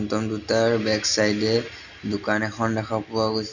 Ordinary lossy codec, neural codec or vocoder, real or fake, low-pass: none; none; real; 7.2 kHz